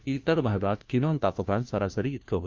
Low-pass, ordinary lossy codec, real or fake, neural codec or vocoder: 7.2 kHz; Opus, 32 kbps; fake; codec, 16 kHz, 1 kbps, FunCodec, trained on LibriTTS, 50 frames a second